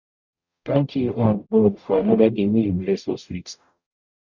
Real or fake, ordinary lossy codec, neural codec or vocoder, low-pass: fake; none; codec, 44.1 kHz, 0.9 kbps, DAC; 7.2 kHz